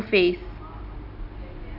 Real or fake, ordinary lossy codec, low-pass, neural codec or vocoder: real; none; 5.4 kHz; none